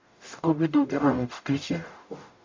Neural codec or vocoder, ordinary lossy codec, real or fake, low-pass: codec, 44.1 kHz, 0.9 kbps, DAC; MP3, 48 kbps; fake; 7.2 kHz